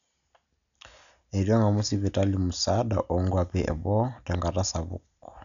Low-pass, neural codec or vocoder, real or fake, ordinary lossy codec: 7.2 kHz; none; real; none